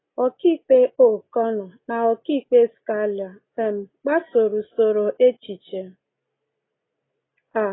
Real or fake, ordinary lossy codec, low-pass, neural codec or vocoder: real; AAC, 16 kbps; 7.2 kHz; none